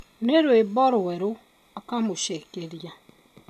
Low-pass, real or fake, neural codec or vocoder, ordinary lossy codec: 14.4 kHz; real; none; none